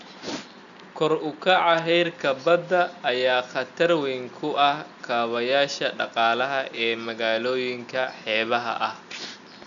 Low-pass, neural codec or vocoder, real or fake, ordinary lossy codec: 7.2 kHz; none; real; none